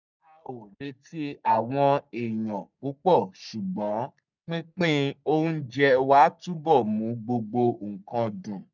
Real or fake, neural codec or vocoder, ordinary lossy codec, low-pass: fake; codec, 44.1 kHz, 3.4 kbps, Pupu-Codec; none; 7.2 kHz